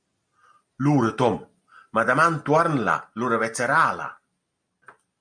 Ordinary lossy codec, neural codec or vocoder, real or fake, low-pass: MP3, 96 kbps; none; real; 9.9 kHz